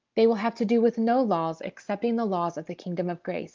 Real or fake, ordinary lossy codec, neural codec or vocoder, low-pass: real; Opus, 32 kbps; none; 7.2 kHz